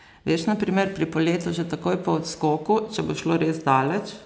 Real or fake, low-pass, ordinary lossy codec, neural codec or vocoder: real; none; none; none